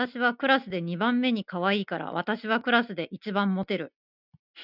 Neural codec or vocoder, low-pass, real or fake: none; 5.4 kHz; real